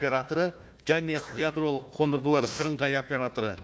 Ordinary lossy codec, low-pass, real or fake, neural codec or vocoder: none; none; fake; codec, 16 kHz, 1 kbps, FunCodec, trained on Chinese and English, 50 frames a second